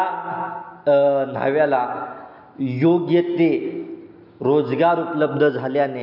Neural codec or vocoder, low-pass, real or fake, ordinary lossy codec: none; 5.4 kHz; real; MP3, 48 kbps